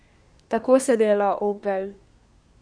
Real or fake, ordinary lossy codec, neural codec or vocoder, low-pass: fake; none; codec, 24 kHz, 1 kbps, SNAC; 9.9 kHz